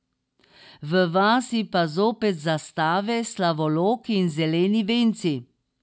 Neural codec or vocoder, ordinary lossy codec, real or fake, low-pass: none; none; real; none